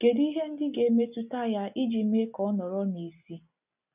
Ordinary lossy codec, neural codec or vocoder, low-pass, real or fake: none; none; 3.6 kHz; real